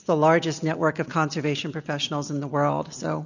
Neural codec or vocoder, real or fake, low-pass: none; real; 7.2 kHz